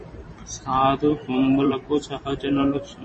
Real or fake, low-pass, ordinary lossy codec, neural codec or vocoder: fake; 10.8 kHz; MP3, 32 kbps; vocoder, 44.1 kHz, 128 mel bands every 512 samples, BigVGAN v2